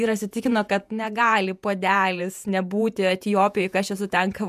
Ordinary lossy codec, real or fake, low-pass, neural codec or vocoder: MP3, 96 kbps; fake; 14.4 kHz; vocoder, 48 kHz, 128 mel bands, Vocos